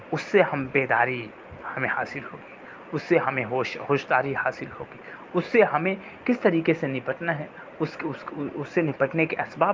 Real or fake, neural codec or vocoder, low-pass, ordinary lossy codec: real; none; 7.2 kHz; Opus, 24 kbps